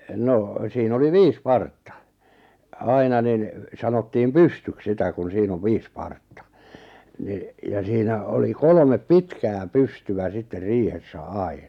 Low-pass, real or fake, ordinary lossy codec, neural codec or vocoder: 19.8 kHz; real; none; none